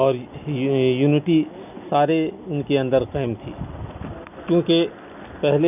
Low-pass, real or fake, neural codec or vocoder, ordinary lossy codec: 3.6 kHz; real; none; none